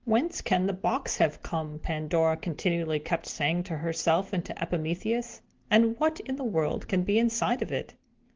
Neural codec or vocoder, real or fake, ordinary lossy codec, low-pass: none; real; Opus, 16 kbps; 7.2 kHz